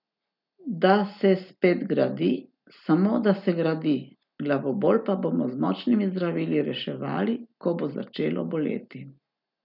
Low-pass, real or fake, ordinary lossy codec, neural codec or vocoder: 5.4 kHz; real; none; none